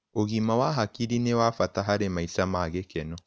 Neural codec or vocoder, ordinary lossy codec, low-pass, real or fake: none; none; none; real